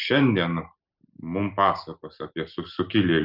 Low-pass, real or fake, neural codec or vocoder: 5.4 kHz; real; none